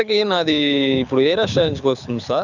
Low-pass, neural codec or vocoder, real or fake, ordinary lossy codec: 7.2 kHz; codec, 24 kHz, 6 kbps, HILCodec; fake; none